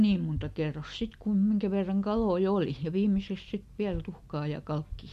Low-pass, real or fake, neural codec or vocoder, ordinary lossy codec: 14.4 kHz; real; none; MP3, 64 kbps